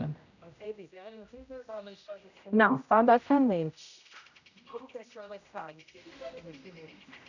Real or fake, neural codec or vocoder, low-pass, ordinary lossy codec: fake; codec, 16 kHz, 0.5 kbps, X-Codec, HuBERT features, trained on general audio; 7.2 kHz; none